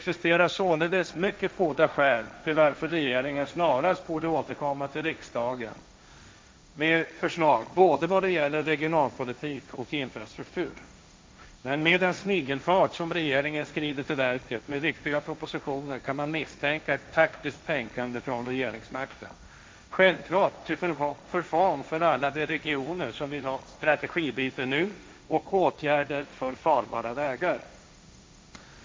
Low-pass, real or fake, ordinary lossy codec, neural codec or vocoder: none; fake; none; codec, 16 kHz, 1.1 kbps, Voila-Tokenizer